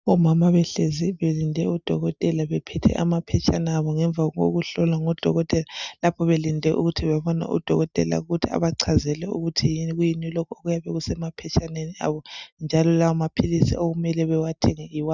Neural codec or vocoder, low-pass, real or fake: none; 7.2 kHz; real